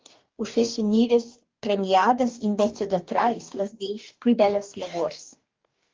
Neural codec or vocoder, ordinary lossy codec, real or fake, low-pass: codec, 44.1 kHz, 2.6 kbps, DAC; Opus, 32 kbps; fake; 7.2 kHz